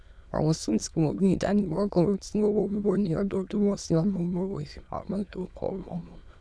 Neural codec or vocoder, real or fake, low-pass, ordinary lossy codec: autoencoder, 22.05 kHz, a latent of 192 numbers a frame, VITS, trained on many speakers; fake; none; none